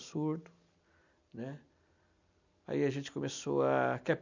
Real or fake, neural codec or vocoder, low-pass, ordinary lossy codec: real; none; 7.2 kHz; none